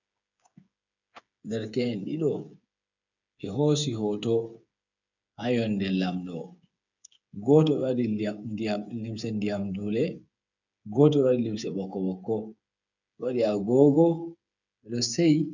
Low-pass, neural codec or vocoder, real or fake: 7.2 kHz; codec, 16 kHz, 8 kbps, FreqCodec, smaller model; fake